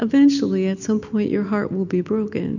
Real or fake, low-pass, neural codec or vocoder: real; 7.2 kHz; none